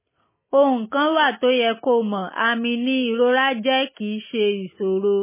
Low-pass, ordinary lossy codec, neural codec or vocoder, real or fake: 3.6 kHz; MP3, 16 kbps; none; real